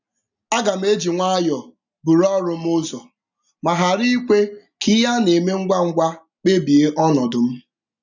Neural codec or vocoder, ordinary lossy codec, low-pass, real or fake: none; none; 7.2 kHz; real